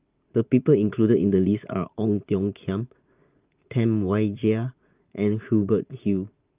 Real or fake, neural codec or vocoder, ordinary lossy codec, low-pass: fake; vocoder, 44.1 kHz, 80 mel bands, Vocos; Opus, 24 kbps; 3.6 kHz